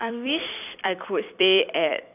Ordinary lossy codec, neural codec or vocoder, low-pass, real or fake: none; vocoder, 44.1 kHz, 128 mel bands every 256 samples, BigVGAN v2; 3.6 kHz; fake